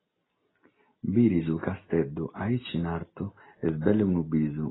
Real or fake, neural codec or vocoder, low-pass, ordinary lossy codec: real; none; 7.2 kHz; AAC, 16 kbps